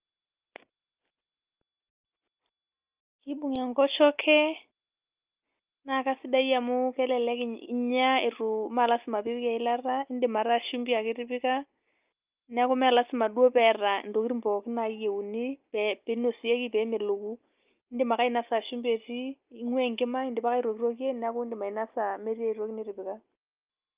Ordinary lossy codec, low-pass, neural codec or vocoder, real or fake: Opus, 24 kbps; 3.6 kHz; none; real